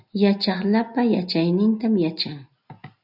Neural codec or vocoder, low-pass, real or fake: none; 5.4 kHz; real